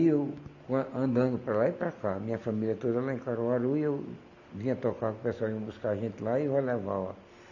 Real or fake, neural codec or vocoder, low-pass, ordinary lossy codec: real; none; 7.2 kHz; none